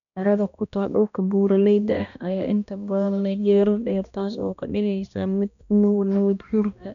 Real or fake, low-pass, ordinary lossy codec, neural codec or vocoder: fake; 7.2 kHz; none; codec, 16 kHz, 1 kbps, X-Codec, HuBERT features, trained on balanced general audio